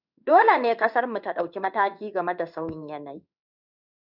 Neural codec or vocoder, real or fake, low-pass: codec, 16 kHz in and 24 kHz out, 1 kbps, XY-Tokenizer; fake; 5.4 kHz